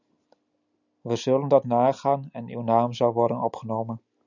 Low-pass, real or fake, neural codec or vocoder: 7.2 kHz; real; none